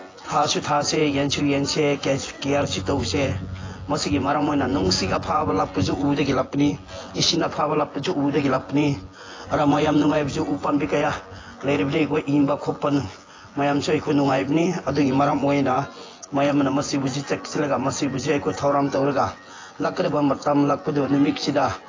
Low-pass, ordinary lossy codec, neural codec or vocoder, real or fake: 7.2 kHz; AAC, 32 kbps; vocoder, 24 kHz, 100 mel bands, Vocos; fake